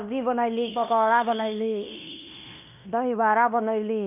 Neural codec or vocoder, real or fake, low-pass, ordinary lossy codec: codec, 16 kHz in and 24 kHz out, 0.9 kbps, LongCat-Audio-Codec, fine tuned four codebook decoder; fake; 3.6 kHz; none